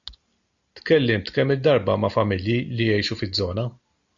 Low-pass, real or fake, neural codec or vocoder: 7.2 kHz; real; none